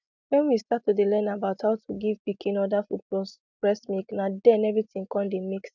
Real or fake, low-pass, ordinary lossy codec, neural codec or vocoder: real; 7.2 kHz; none; none